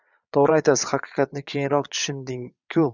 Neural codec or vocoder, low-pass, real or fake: vocoder, 22.05 kHz, 80 mel bands, Vocos; 7.2 kHz; fake